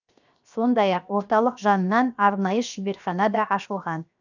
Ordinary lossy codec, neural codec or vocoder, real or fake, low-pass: none; codec, 16 kHz, 0.7 kbps, FocalCodec; fake; 7.2 kHz